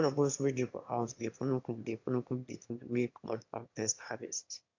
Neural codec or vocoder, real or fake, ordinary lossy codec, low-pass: autoencoder, 22.05 kHz, a latent of 192 numbers a frame, VITS, trained on one speaker; fake; AAC, 48 kbps; 7.2 kHz